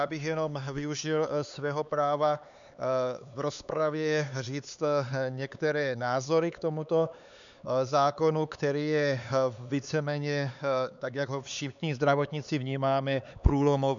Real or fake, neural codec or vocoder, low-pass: fake; codec, 16 kHz, 4 kbps, X-Codec, HuBERT features, trained on LibriSpeech; 7.2 kHz